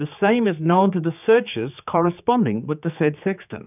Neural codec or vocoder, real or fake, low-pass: codec, 16 kHz, 4 kbps, X-Codec, HuBERT features, trained on general audio; fake; 3.6 kHz